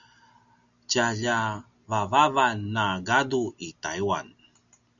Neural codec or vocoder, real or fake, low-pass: none; real; 7.2 kHz